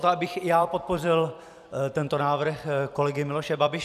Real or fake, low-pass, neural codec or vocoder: fake; 14.4 kHz; vocoder, 44.1 kHz, 128 mel bands every 256 samples, BigVGAN v2